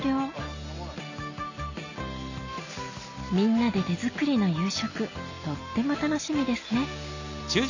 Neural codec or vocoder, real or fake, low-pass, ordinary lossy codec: none; real; 7.2 kHz; none